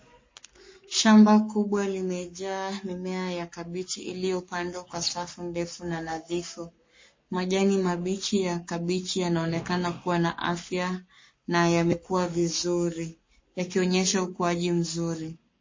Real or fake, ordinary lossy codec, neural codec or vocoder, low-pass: fake; MP3, 32 kbps; codec, 44.1 kHz, 7.8 kbps, Pupu-Codec; 7.2 kHz